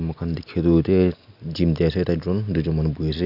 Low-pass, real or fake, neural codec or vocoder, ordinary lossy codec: 5.4 kHz; real; none; none